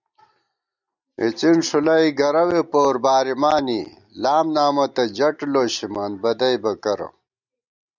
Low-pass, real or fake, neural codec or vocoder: 7.2 kHz; real; none